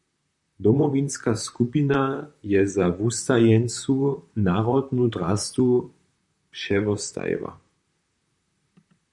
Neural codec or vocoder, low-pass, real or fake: vocoder, 44.1 kHz, 128 mel bands, Pupu-Vocoder; 10.8 kHz; fake